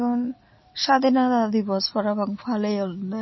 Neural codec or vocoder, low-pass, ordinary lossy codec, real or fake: none; 7.2 kHz; MP3, 24 kbps; real